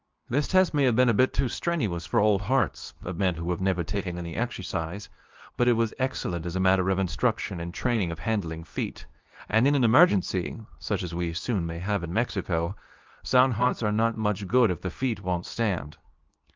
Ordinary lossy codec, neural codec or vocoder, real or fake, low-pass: Opus, 24 kbps; codec, 24 kHz, 0.9 kbps, WavTokenizer, medium speech release version 2; fake; 7.2 kHz